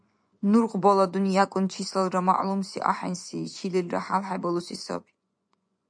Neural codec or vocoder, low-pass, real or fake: vocoder, 24 kHz, 100 mel bands, Vocos; 9.9 kHz; fake